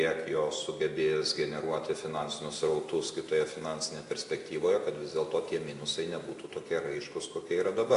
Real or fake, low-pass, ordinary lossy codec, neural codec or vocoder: real; 10.8 kHz; AAC, 48 kbps; none